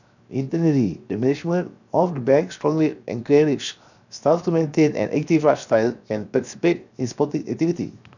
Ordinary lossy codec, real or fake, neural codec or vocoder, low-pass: none; fake; codec, 16 kHz, 0.7 kbps, FocalCodec; 7.2 kHz